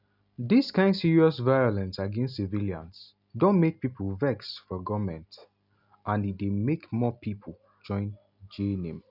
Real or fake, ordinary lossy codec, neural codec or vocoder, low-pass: real; none; none; 5.4 kHz